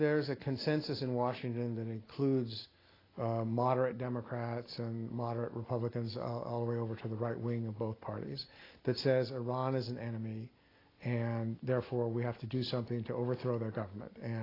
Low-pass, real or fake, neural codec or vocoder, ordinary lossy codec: 5.4 kHz; real; none; AAC, 24 kbps